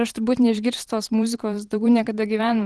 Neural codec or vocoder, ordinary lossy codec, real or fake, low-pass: vocoder, 44.1 kHz, 128 mel bands every 512 samples, BigVGAN v2; Opus, 16 kbps; fake; 10.8 kHz